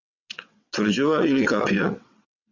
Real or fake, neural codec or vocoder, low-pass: fake; vocoder, 22.05 kHz, 80 mel bands, WaveNeXt; 7.2 kHz